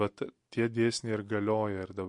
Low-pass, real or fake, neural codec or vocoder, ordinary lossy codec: 10.8 kHz; real; none; MP3, 48 kbps